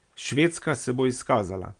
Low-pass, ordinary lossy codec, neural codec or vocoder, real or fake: 9.9 kHz; Opus, 24 kbps; none; real